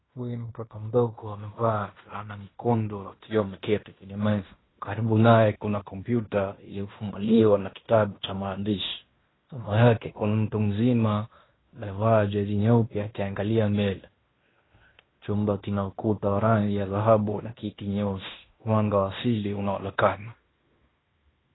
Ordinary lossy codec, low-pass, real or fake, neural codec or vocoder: AAC, 16 kbps; 7.2 kHz; fake; codec, 16 kHz in and 24 kHz out, 0.9 kbps, LongCat-Audio-Codec, fine tuned four codebook decoder